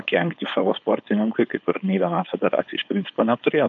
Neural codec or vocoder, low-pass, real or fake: codec, 16 kHz, 4.8 kbps, FACodec; 7.2 kHz; fake